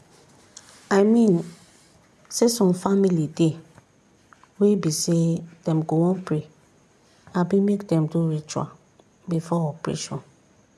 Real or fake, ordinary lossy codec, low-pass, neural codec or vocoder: real; none; none; none